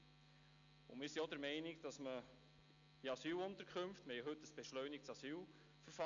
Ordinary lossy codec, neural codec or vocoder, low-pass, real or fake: MP3, 64 kbps; none; 7.2 kHz; real